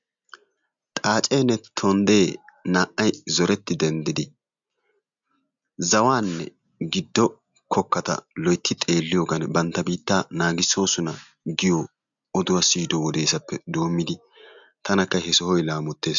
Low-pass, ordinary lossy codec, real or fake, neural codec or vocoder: 7.2 kHz; MP3, 64 kbps; real; none